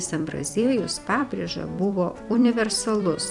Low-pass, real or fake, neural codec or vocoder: 10.8 kHz; fake; vocoder, 44.1 kHz, 128 mel bands every 256 samples, BigVGAN v2